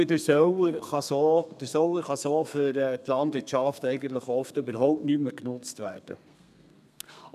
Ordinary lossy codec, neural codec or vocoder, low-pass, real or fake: none; codec, 32 kHz, 1.9 kbps, SNAC; 14.4 kHz; fake